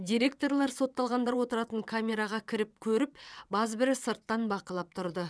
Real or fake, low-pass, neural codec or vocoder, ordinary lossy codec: fake; none; vocoder, 22.05 kHz, 80 mel bands, WaveNeXt; none